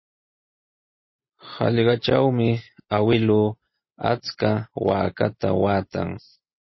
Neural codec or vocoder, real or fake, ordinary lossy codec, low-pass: none; real; MP3, 24 kbps; 7.2 kHz